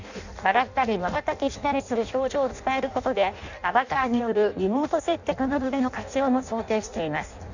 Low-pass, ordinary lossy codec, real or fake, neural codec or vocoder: 7.2 kHz; none; fake; codec, 16 kHz in and 24 kHz out, 0.6 kbps, FireRedTTS-2 codec